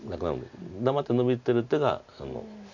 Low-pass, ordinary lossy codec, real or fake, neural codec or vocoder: 7.2 kHz; none; real; none